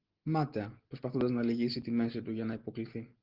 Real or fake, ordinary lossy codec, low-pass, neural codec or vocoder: real; Opus, 16 kbps; 5.4 kHz; none